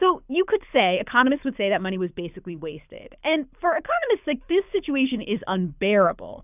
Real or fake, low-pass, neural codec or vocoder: fake; 3.6 kHz; codec, 24 kHz, 6 kbps, HILCodec